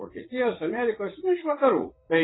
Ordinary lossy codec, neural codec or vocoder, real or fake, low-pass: AAC, 16 kbps; vocoder, 44.1 kHz, 80 mel bands, Vocos; fake; 7.2 kHz